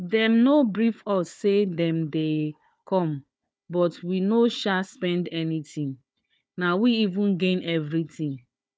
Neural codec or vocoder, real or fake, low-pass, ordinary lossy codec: codec, 16 kHz, 4 kbps, FunCodec, trained on Chinese and English, 50 frames a second; fake; none; none